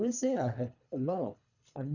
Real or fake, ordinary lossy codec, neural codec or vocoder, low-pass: fake; none; codec, 24 kHz, 3 kbps, HILCodec; 7.2 kHz